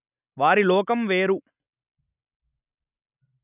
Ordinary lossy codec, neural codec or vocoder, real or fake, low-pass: none; none; real; 3.6 kHz